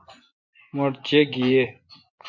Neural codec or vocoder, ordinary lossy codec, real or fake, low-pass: vocoder, 24 kHz, 100 mel bands, Vocos; MP3, 48 kbps; fake; 7.2 kHz